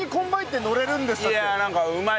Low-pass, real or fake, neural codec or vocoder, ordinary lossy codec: none; real; none; none